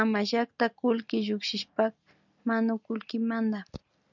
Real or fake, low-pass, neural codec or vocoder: real; 7.2 kHz; none